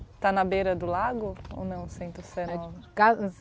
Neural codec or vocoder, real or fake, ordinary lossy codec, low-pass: none; real; none; none